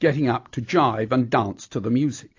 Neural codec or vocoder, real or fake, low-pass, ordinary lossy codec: none; real; 7.2 kHz; AAC, 48 kbps